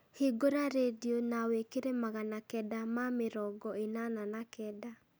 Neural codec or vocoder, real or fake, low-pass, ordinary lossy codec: none; real; none; none